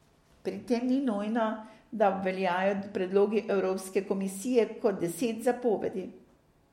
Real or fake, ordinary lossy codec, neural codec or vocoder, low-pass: real; MP3, 64 kbps; none; 19.8 kHz